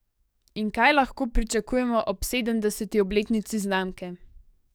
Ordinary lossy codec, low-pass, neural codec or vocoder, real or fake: none; none; codec, 44.1 kHz, 7.8 kbps, DAC; fake